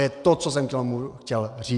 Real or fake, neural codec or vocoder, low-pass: real; none; 10.8 kHz